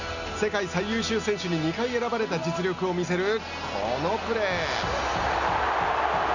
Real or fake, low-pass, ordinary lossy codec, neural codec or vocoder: real; 7.2 kHz; none; none